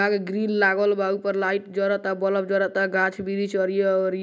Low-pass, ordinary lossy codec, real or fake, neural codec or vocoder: none; none; real; none